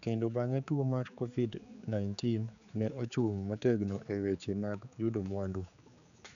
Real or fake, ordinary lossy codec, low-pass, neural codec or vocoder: fake; none; 7.2 kHz; codec, 16 kHz, 4 kbps, X-Codec, HuBERT features, trained on general audio